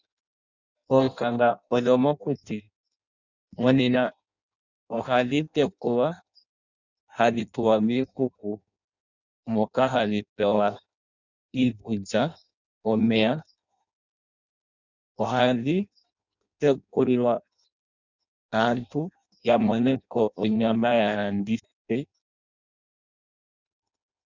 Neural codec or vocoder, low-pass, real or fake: codec, 16 kHz in and 24 kHz out, 0.6 kbps, FireRedTTS-2 codec; 7.2 kHz; fake